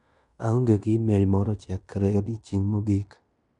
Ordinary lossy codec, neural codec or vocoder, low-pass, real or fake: none; codec, 16 kHz in and 24 kHz out, 0.9 kbps, LongCat-Audio-Codec, fine tuned four codebook decoder; 10.8 kHz; fake